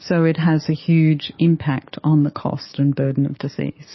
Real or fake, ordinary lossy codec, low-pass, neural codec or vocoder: fake; MP3, 24 kbps; 7.2 kHz; codec, 44.1 kHz, 7.8 kbps, DAC